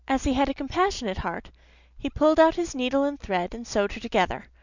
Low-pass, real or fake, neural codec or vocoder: 7.2 kHz; real; none